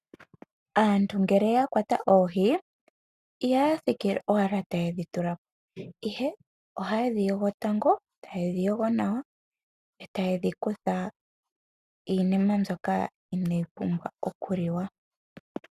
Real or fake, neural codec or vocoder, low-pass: real; none; 14.4 kHz